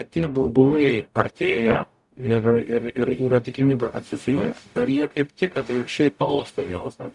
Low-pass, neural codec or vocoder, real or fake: 10.8 kHz; codec, 44.1 kHz, 0.9 kbps, DAC; fake